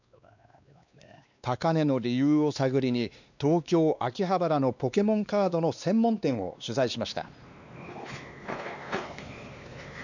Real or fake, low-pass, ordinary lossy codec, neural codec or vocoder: fake; 7.2 kHz; none; codec, 16 kHz, 2 kbps, X-Codec, WavLM features, trained on Multilingual LibriSpeech